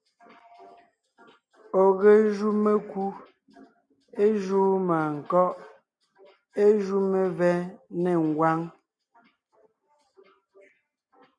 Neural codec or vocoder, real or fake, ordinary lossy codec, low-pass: none; real; MP3, 64 kbps; 9.9 kHz